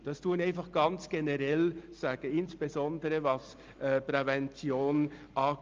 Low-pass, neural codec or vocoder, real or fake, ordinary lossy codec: 7.2 kHz; none; real; Opus, 24 kbps